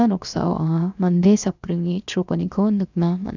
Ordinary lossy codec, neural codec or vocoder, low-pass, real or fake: none; codec, 16 kHz, 0.7 kbps, FocalCodec; 7.2 kHz; fake